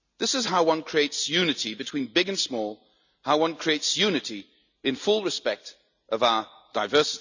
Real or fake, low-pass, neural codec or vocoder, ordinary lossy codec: real; 7.2 kHz; none; none